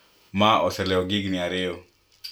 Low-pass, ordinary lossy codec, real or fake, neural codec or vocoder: none; none; real; none